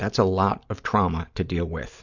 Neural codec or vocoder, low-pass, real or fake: none; 7.2 kHz; real